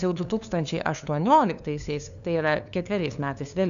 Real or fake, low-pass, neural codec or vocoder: fake; 7.2 kHz; codec, 16 kHz, 2 kbps, FunCodec, trained on LibriTTS, 25 frames a second